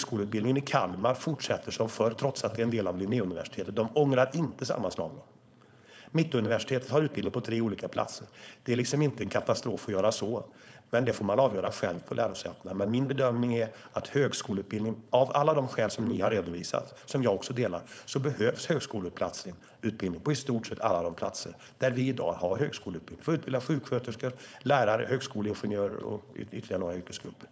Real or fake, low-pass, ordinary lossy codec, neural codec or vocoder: fake; none; none; codec, 16 kHz, 4.8 kbps, FACodec